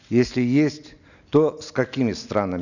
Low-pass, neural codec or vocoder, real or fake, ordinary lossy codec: 7.2 kHz; none; real; none